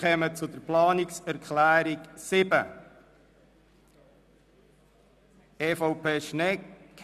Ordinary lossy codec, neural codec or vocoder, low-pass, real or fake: none; none; 14.4 kHz; real